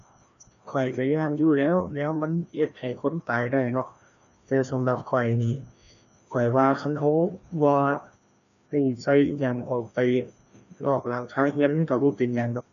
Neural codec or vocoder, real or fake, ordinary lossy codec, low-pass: codec, 16 kHz, 1 kbps, FreqCodec, larger model; fake; none; 7.2 kHz